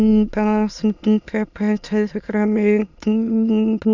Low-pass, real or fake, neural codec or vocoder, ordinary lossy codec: 7.2 kHz; fake; autoencoder, 22.05 kHz, a latent of 192 numbers a frame, VITS, trained on many speakers; none